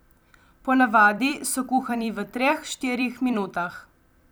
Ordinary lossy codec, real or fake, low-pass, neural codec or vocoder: none; fake; none; vocoder, 44.1 kHz, 128 mel bands every 256 samples, BigVGAN v2